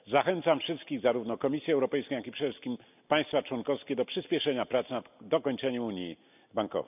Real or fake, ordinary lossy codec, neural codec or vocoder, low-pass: real; none; none; 3.6 kHz